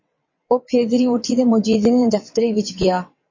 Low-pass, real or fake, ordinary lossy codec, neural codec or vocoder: 7.2 kHz; fake; MP3, 32 kbps; vocoder, 22.05 kHz, 80 mel bands, WaveNeXt